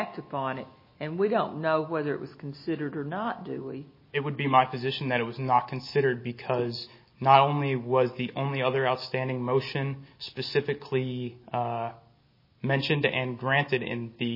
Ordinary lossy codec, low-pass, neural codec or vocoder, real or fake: MP3, 24 kbps; 5.4 kHz; none; real